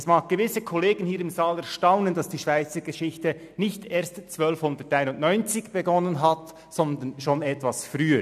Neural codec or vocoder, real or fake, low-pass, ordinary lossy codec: none; real; 14.4 kHz; none